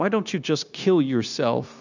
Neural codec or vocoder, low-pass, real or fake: codec, 16 kHz, 0.9 kbps, LongCat-Audio-Codec; 7.2 kHz; fake